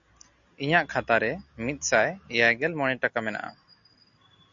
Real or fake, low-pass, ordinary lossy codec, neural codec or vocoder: real; 7.2 kHz; MP3, 96 kbps; none